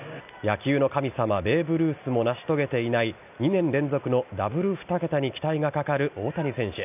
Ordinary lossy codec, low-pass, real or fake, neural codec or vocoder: none; 3.6 kHz; real; none